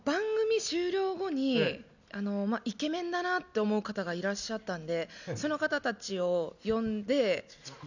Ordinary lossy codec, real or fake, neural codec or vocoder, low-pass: none; real; none; 7.2 kHz